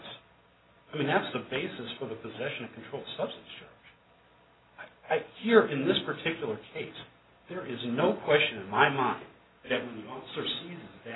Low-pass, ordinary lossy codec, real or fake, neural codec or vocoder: 7.2 kHz; AAC, 16 kbps; real; none